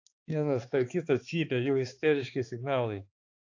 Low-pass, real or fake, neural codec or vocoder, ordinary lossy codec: 7.2 kHz; fake; codec, 16 kHz, 2 kbps, X-Codec, HuBERT features, trained on balanced general audio; AAC, 48 kbps